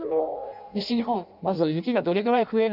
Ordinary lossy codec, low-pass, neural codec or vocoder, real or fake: none; 5.4 kHz; codec, 16 kHz in and 24 kHz out, 0.6 kbps, FireRedTTS-2 codec; fake